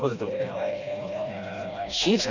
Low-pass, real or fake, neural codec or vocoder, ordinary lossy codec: 7.2 kHz; fake; codec, 16 kHz, 1 kbps, FreqCodec, smaller model; none